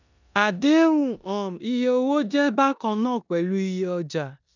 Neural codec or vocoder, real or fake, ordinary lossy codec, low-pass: codec, 16 kHz in and 24 kHz out, 0.9 kbps, LongCat-Audio-Codec, four codebook decoder; fake; none; 7.2 kHz